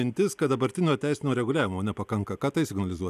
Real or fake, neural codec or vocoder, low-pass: real; none; 14.4 kHz